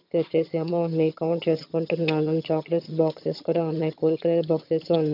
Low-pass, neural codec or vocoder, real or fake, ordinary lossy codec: 5.4 kHz; vocoder, 22.05 kHz, 80 mel bands, HiFi-GAN; fake; none